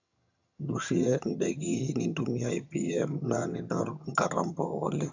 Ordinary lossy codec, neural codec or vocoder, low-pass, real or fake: none; vocoder, 22.05 kHz, 80 mel bands, HiFi-GAN; 7.2 kHz; fake